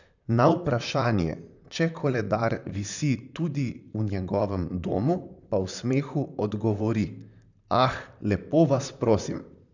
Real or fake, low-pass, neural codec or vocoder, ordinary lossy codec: fake; 7.2 kHz; vocoder, 44.1 kHz, 128 mel bands, Pupu-Vocoder; none